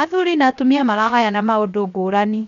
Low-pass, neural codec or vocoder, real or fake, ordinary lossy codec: 7.2 kHz; codec, 16 kHz, 0.7 kbps, FocalCodec; fake; none